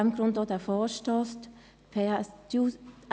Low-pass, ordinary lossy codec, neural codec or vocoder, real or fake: none; none; none; real